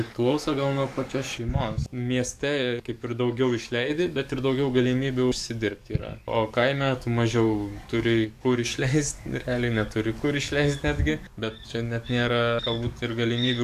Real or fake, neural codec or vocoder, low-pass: fake; codec, 44.1 kHz, 7.8 kbps, DAC; 14.4 kHz